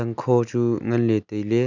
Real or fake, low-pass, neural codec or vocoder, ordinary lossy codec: real; 7.2 kHz; none; none